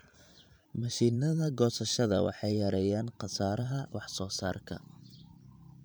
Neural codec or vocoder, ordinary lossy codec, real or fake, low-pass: vocoder, 44.1 kHz, 128 mel bands every 512 samples, BigVGAN v2; none; fake; none